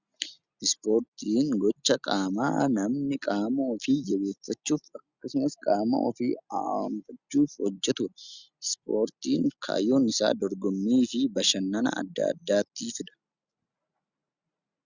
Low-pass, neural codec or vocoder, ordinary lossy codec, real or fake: 7.2 kHz; none; Opus, 64 kbps; real